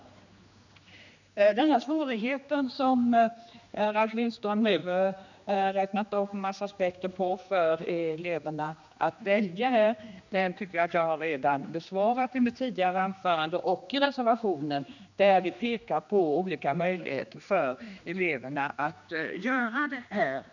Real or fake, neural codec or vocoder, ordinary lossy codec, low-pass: fake; codec, 16 kHz, 2 kbps, X-Codec, HuBERT features, trained on general audio; none; 7.2 kHz